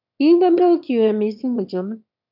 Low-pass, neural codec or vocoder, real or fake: 5.4 kHz; autoencoder, 22.05 kHz, a latent of 192 numbers a frame, VITS, trained on one speaker; fake